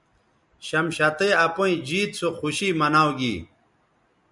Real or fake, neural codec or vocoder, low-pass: real; none; 10.8 kHz